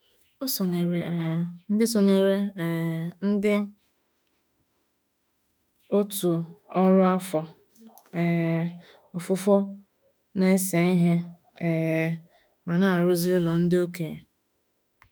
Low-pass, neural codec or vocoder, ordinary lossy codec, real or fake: none; autoencoder, 48 kHz, 32 numbers a frame, DAC-VAE, trained on Japanese speech; none; fake